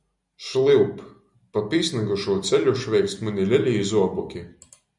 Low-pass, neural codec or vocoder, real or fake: 10.8 kHz; none; real